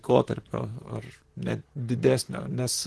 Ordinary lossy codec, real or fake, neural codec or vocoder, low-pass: Opus, 16 kbps; fake; vocoder, 44.1 kHz, 128 mel bands, Pupu-Vocoder; 10.8 kHz